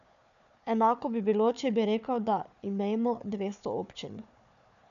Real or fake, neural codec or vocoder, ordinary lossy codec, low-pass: fake; codec, 16 kHz, 4 kbps, FunCodec, trained on Chinese and English, 50 frames a second; none; 7.2 kHz